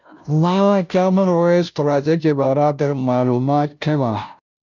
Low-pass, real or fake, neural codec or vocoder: 7.2 kHz; fake; codec, 16 kHz, 0.5 kbps, FunCodec, trained on Chinese and English, 25 frames a second